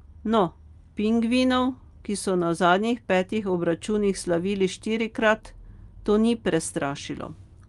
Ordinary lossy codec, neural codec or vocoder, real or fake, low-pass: Opus, 32 kbps; none; real; 10.8 kHz